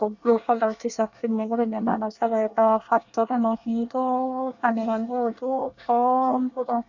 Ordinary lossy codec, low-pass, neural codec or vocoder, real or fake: none; 7.2 kHz; codec, 24 kHz, 1 kbps, SNAC; fake